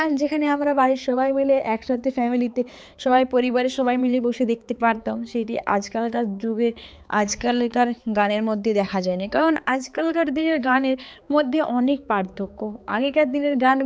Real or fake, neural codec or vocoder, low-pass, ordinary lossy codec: fake; codec, 16 kHz, 4 kbps, X-Codec, HuBERT features, trained on balanced general audio; none; none